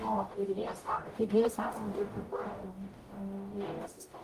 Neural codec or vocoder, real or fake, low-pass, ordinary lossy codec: codec, 44.1 kHz, 0.9 kbps, DAC; fake; 14.4 kHz; Opus, 24 kbps